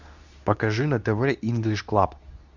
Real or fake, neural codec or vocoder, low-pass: fake; codec, 24 kHz, 0.9 kbps, WavTokenizer, medium speech release version 2; 7.2 kHz